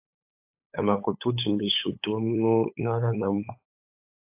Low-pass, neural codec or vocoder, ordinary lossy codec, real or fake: 3.6 kHz; codec, 16 kHz, 8 kbps, FunCodec, trained on LibriTTS, 25 frames a second; AAC, 32 kbps; fake